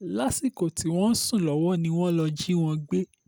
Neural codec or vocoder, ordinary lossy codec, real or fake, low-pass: none; none; real; none